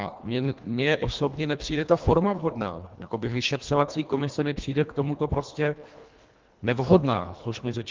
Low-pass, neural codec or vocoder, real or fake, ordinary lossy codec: 7.2 kHz; codec, 24 kHz, 1.5 kbps, HILCodec; fake; Opus, 24 kbps